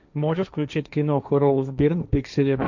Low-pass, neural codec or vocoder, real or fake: 7.2 kHz; codec, 16 kHz, 1.1 kbps, Voila-Tokenizer; fake